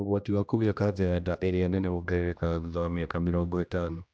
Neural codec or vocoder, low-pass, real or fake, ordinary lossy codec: codec, 16 kHz, 1 kbps, X-Codec, HuBERT features, trained on general audio; none; fake; none